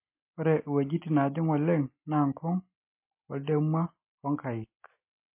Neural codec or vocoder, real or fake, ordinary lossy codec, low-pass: none; real; MP3, 24 kbps; 3.6 kHz